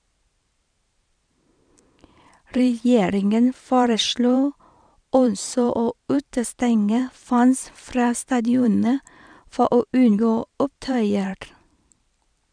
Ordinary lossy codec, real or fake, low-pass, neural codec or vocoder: none; fake; 9.9 kHz; vocoder, 44.1 kHz, 128 mel bands every 512 samples, BigVGAN v2